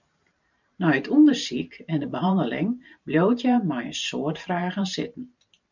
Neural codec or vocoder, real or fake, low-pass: vocoder, 44.1 kHz, 128 mel bands every 512 samples, BigVGAN v2; fake; 7.2 kHz